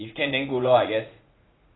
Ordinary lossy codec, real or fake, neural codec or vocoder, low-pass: AAC, 16 kbps; fake; vocoder, 44.1 kHz, 80 mel bands, Vocos; 7.2 kHz